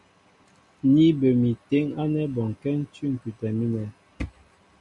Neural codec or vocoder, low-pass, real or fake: none; 10.8 kHz; real